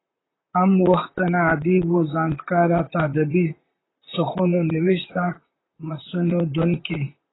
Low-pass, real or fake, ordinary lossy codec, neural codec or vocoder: 7.2 kHz; fake; AAC, 16 kbps; vocoder, 44.1 kHz, 128 mel bands, Pupu-Vocoder